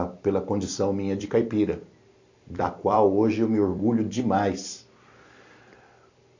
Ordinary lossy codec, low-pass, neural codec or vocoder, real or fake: AAC, 48 kbps; 7.2 kHz; vocoder, 44.1 kHz, 128 mel bands every 256 samples, BigVGAN v2; fake